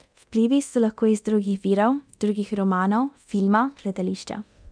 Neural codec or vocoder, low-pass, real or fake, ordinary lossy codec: codec, 24 kHz, 0.9 kbps, DualCodec; 9.9 kHz; fake; none